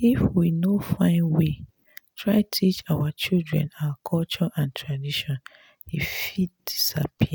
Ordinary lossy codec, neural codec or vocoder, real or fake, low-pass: none; none; real; none